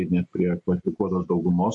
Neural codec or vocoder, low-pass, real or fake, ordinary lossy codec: none; 10.8 kHz; real; MP3, 48 kbps